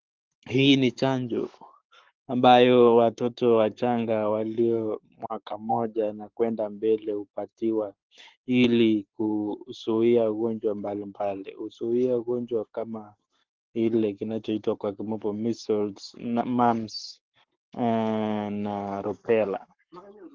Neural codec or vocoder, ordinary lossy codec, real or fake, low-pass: codec, 44.1 kHz, 7.8 kbps, Pupu-Codec; Opus, 16 kbps; fake; 7.2 kHz